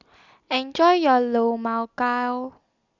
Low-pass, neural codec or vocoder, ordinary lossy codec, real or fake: 7.2 kHz; none; Opus, 64 kbps; real